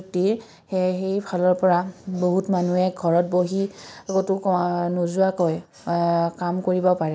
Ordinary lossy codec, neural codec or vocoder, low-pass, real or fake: none; none; none; real